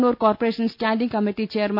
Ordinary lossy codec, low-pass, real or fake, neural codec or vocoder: none; 5.4 kHz; real; none